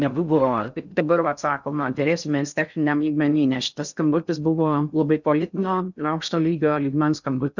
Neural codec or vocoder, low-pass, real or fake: codec, 16 kHz in and 24 kHz out, 0.6 kbps, FocalCodec, streaming, 4096 codes; 7.2 kHz; fake